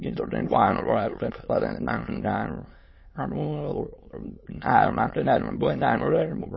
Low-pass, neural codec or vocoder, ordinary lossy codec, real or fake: 7.2 kHz; autoencoder, 22.05 kHz, a latent of 192 numbers a frame, VITS, trained on many speakers; MP3, 24 kbps; fake